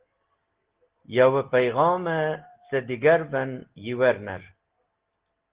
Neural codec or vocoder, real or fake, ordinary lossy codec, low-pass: none; real; Opus, 16 kbps; 3.6 kHz